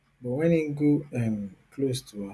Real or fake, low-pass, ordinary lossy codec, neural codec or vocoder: real; none; none; none